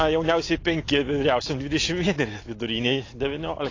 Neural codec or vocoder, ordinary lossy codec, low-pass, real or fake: none; AAC, 32 kbps; 7.2 kHz; real